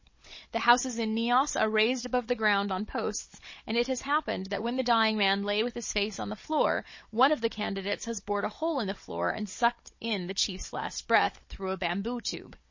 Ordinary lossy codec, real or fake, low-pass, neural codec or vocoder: MP3, 32 kbps; fake; 7.2 kHz; codec, 16 kHz, 16 kbps, FunCodec, trained on Chinese and English, 50 frames a second